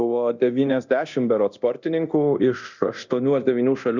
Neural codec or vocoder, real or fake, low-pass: codec, 24 kHz, 0.9 kbps, DualCodec; fake; 7.2 kHz